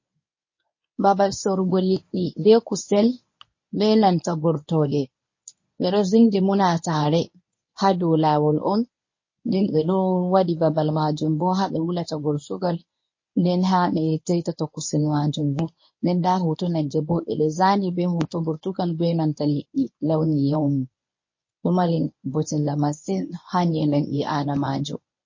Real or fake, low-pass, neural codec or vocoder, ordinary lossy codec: fake; 7.2 kHz; codec, 24 kHz, 0.9 kbps, WavTokenizer, medium speech release version 1; MP3, 32 kbps